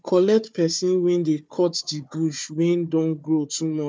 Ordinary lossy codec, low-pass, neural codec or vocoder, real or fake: none; none; codec, 16 kHz, 4 kbps, FunCodec, trained on Chinese and English, 50 frames a second; fake